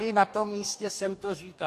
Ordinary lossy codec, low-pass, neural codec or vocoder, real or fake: AAC, 64 kbps; 14.4 kHz; codec, 44.1 kHz, 2.6 kbps, DAC; fake